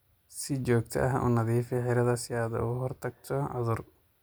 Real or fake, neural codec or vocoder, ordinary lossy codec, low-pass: real; none; none; none